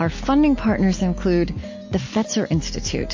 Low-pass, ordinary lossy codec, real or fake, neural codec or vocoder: 7.2 kHz; MP3, 32 kbps; real; none